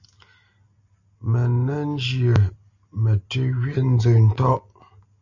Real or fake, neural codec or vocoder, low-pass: real; none; 7.2 kHz